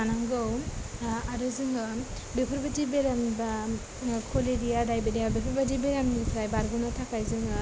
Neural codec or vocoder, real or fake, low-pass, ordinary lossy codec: none; real; none; none